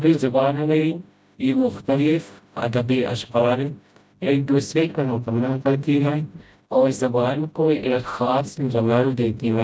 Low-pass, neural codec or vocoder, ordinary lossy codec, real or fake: none; codec, 16 kHz, 0.5 kbps, FreqCodec, smaller model; none; fake